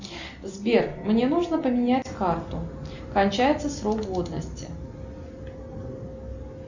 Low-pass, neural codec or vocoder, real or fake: 7.2 kHz; none; real